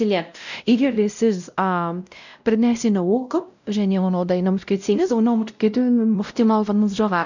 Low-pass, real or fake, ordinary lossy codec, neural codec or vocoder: 7.2 kHz; fake; none; codec, 16 kHz, 0.5 kbps, X-Codec, WavLM features, trained on Multilingual LibriSpeech